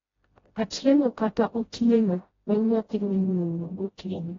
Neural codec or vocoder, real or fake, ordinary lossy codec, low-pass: codec, 16 kHz, 0.5 kbps, FreqCodec, smaller model; fake; AAC, 24 kbps; 7.2 kHz